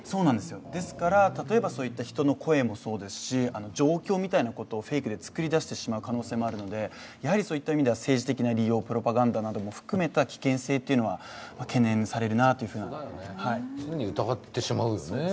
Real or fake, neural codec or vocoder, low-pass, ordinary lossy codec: real; none; none; none